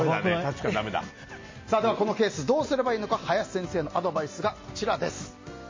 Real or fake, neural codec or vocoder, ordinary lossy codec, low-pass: real; none; MP3, 32 kbps; 7.2 kHz